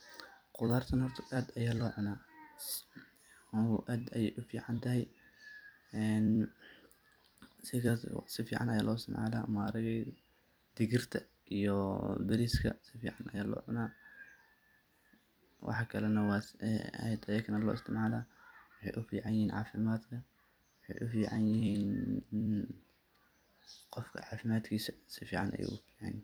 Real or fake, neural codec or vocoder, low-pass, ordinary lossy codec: fake; vocoder, 44.1 kHz, 128 mel bands every 256 samples, BigVGAN v2; none; none